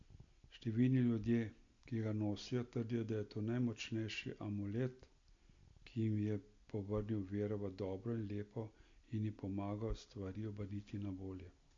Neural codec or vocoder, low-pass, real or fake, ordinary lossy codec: none; 7.2 kHz; real; AAC, 48 kbps